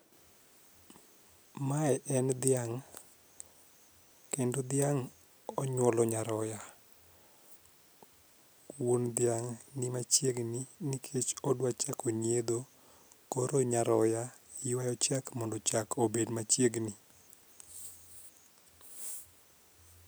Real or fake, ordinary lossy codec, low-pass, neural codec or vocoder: real; none; none; none